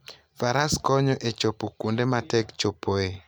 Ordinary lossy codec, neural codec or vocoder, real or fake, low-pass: none; none; real; none